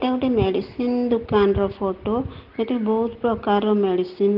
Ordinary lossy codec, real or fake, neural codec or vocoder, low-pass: Opus, 16 kbps; real; none; 5.4 kHz